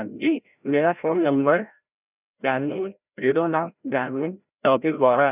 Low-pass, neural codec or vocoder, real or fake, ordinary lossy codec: 3.6 kHz; codec, 16 kHz, 0.5 kbps, FreqCodec, larger model; fake; none